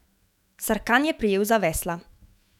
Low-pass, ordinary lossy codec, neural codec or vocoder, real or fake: 19.8 kHz; none; autoencoder, 48 kHz, 128 numbers a frame, DAC-VAE, trained on Japanese speech; fake